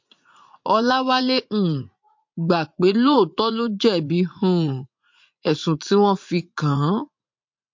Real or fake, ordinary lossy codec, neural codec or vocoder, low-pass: fake; MP3, 48 kbps; vocoder, 44.1 kHz, 80 mel bands, Vocos; 7.2 kHz